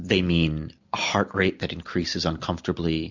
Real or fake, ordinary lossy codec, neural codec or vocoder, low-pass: real; MP3, 64 kbps; none; 7.2 kHz